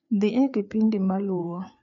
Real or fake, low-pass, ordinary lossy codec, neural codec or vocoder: fake; 7.2 kHz; none; codec, 16 kHz, 4 kbps, FreqCodec, larger model